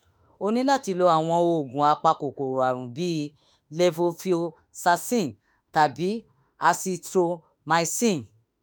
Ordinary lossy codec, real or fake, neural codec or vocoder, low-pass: none; fake; autoencoder, 48 kHz, 32 numbers a frame, DAC-VAE, trained on Japanese speech; none